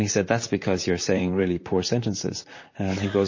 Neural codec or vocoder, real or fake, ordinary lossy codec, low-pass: vocoder, 44.1 kHz, 128 mel bands, Pupu-Vocoder; fake; MP3, 32 kbps; 7.2 kHz